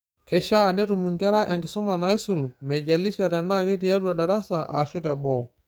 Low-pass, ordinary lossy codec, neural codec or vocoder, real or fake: none; none; codec, 44.1 kHz, 2.6 kbps, SNAC; fake